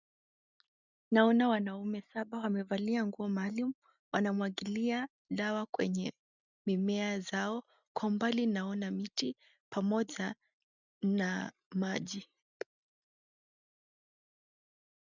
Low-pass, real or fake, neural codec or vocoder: 7.2 kHz; real; none